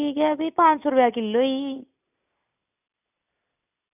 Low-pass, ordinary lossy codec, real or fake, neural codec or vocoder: 3.6 kHz; none; real; none